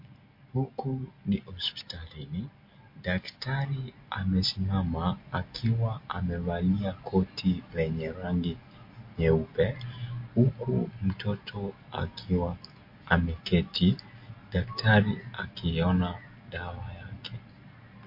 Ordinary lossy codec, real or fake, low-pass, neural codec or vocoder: MP3, 32 kbps; real; 5.4 kHz; none